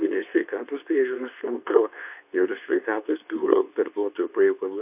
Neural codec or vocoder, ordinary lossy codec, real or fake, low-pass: codec, 24 kHz, 0.9 kbps, WavTokenizer, medium speech release version 2; AAC, 32 kbps; fake; 3.6 kHz